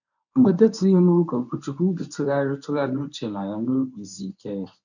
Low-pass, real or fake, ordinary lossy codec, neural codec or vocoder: 7.2 kHz; fake; none; codec, 24 kHz, 0.9 kbps, WavTokenizer, medium speech release version 1